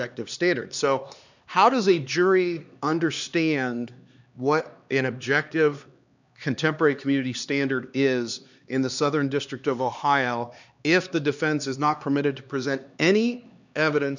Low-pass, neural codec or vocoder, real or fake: 7.2 kHz; codec, 16 kHz, 2 kbps, X-Codec, HuBERT features, trained on LibriSpeech; fake